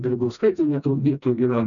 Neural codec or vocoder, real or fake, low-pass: codec, 16 kHz, 1 kbps, FreqCodec, smaller model; fake; 7.2 kHz